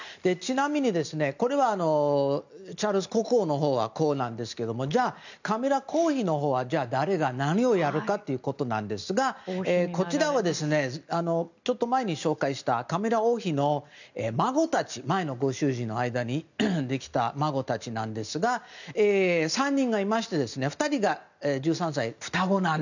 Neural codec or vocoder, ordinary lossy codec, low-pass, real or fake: none; none; 7.2 kHz; real